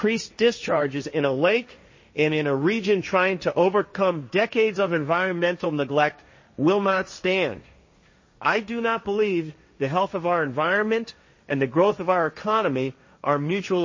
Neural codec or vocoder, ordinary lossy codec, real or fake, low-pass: codec, 16 kHz, 1.1 kbps, Voila-Tokenizer; MP3, 32 kbps; fake; 7.2 kHz